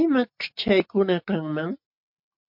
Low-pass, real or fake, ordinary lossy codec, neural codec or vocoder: 5.4 kHz; real; MP3, 48 kbps; none